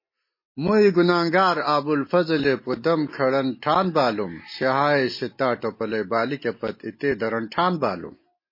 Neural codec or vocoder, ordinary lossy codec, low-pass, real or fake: none; MP3, 24 kbps; 5.4 kHz; real